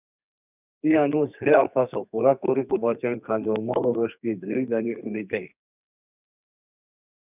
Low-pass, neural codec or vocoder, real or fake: 3.6 kHz; codec, 32 kHz, 1.9 kbps, SNAC; fake